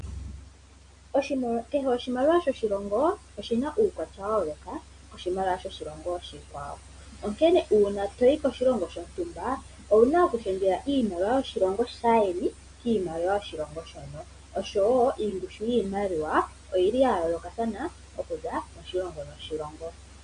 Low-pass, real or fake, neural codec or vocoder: 9.9 kHz; real; none